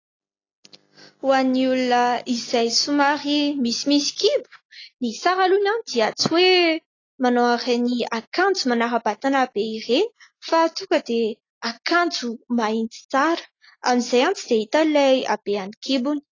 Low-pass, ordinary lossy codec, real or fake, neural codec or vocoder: 7.2 kHz; AAC, 32 kbps; real; none